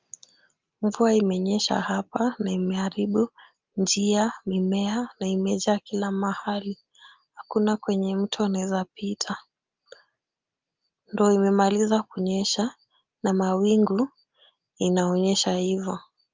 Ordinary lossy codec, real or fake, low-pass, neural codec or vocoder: Opus, 24 kbps; real; 7.2 kHz; none